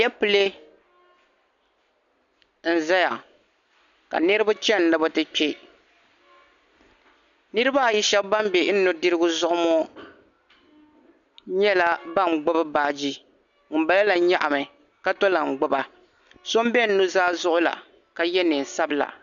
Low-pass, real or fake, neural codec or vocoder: 7.2 kHz; real; none